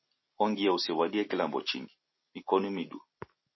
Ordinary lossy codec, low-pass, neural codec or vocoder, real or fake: MP3, 24 kbps; 7.2 kHz; none; real